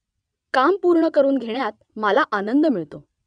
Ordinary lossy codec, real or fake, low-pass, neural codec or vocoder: none; fake; 9.9 kHz; vocoder, 22.05 kHz, 80 mel bands, WaveNeXt